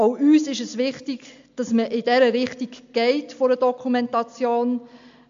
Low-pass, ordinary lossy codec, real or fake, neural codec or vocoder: 7.2 kHz; AAC, 64 kbps; real; none